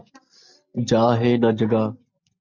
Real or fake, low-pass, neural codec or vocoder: real; 7.2 kHz; none